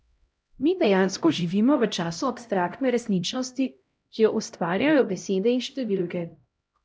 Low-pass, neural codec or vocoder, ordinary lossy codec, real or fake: none; codec, 16 kHz, 0.5 kbps, X-Codec, HuBERT features, trained on LibriSpeech; none; fake